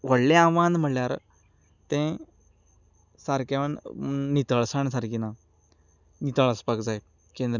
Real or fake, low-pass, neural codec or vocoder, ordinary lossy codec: real; 7.2 kHz; none; none